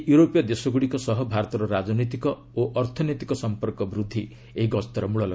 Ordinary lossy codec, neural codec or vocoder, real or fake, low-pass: none; none; real; none